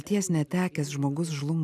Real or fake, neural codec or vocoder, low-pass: real; none; 14.4 kHz